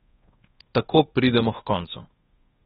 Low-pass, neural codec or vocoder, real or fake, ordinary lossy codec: 10.8 kHz; codec, 24 kHz, 1.2 kbps, DualCodec; fake; AAC, 16 kbps